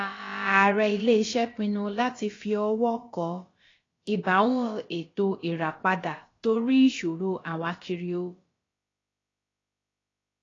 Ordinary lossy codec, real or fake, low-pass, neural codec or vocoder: AAC, 32 kbps; fake; 7.2 kHz; codec, 16 kHz, about 1 kbps, DyCAST, with the encoder's durations